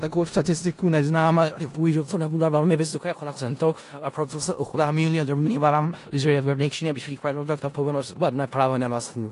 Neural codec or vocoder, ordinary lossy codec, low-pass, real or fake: codec, 16 kHz in and 24 kHz out, 0.4 kbps, LongCat-Audio-Codec, four codebook decoder; AAC, 48 kbps; 10.8 kHz; fake